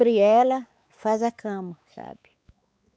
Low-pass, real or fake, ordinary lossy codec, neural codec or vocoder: none; fake; none; codec, 16 kHz, 4 kbps, X-Codec, WavLM features, trained on Multilingual LibriSpeech